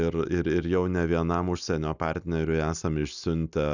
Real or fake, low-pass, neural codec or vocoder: real; 7.2 kHz; none